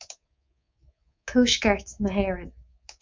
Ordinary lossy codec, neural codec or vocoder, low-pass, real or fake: MP3, 64 kbps; codec, 24 kHz, 3.1 kbps, DualCodec; 7.2 kHz; fake